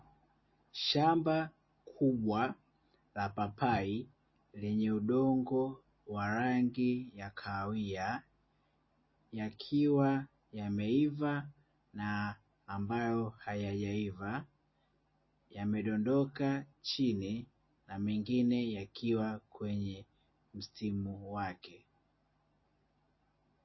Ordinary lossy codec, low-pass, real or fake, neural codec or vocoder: MP3, 24 kbps; 7.2 kHz; real; none